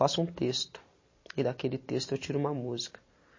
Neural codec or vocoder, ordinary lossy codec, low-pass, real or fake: none; MP3, 32 kbps; 7.2 kHz; real